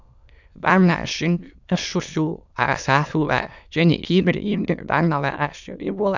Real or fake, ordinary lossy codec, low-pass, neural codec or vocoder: fake; none; 7.2 kHz; autoencoder, 22.05 kHz, a latent of 192 numbers a frame, VITS, trained on many speakers